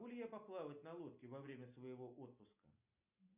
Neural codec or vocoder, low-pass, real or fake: none; 3.6 kHz; real